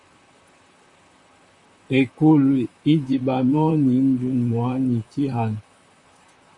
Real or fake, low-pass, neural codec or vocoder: fake; 10.8 kHz; vocoder, 44.1 kHz, 128 mel bands, Pupu-Vocoder